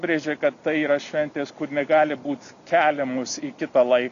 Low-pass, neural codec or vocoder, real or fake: 7.2 kHz; none; real